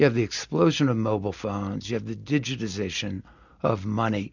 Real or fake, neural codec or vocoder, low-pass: real; none; 7.2 kHz